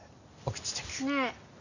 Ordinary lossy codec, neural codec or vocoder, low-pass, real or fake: none; none; 7.2 kHz; real